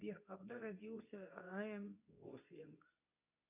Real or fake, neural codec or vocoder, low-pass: fake; codec, 24 kHz, 0.9 kbps, WavTokenizer, medium speech release version 2; 3.6 kHz